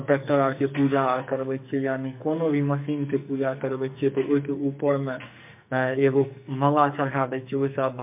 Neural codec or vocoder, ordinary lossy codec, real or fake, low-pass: codec, 44.1 kHz, 2.6 kbps, SNAC; MP3, 32 kbps; fake; 3.6 kHz